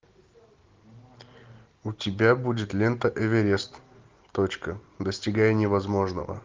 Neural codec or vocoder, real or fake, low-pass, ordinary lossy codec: none; real; 7.2 kHz; Opus, 32 kbps